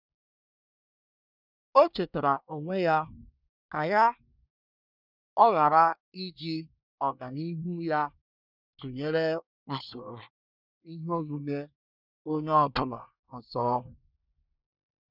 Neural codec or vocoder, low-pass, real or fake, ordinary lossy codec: codec, 24 kHz, 1 kbps, SNAC; 5.4 kHz; fake; none